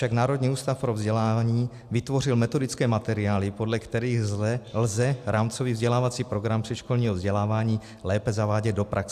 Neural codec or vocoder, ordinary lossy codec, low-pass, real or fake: none; AAC, 96 kbps; 14.4 kHz; real